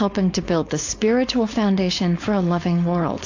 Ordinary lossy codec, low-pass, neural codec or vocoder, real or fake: AAC, 48 kbps; 7.2 kHz; codec, 16 kHz, 4.8 kbps, FACodec; fake